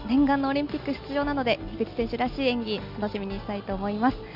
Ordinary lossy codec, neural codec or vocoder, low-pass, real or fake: none; none; 5.4 kHz; real